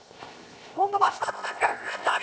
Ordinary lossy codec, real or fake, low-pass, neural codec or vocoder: none; fake; none; codec, 16 kHz, 0.7 kbps, FocalCodec